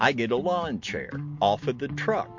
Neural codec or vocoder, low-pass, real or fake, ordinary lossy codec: none; 7.2 kHz; real; MP3, 48 kbps